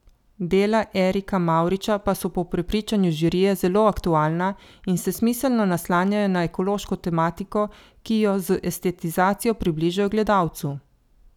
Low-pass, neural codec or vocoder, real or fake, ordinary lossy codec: 19.8 kHz; none; real; none